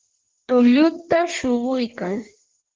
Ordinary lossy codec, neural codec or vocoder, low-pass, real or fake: Opus, 16 kbps; codec, 16 kHz in and 24 kHz out, 1.1 kbps, FireRedTTS-2 codec; 7.2 kHz; fake